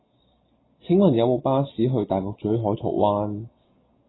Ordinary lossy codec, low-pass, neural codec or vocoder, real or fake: AAC, 16 kbps; 7.2 kHz; none; real